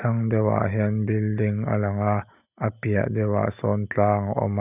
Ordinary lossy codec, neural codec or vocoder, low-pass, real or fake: none; none; 3.6 kHz; real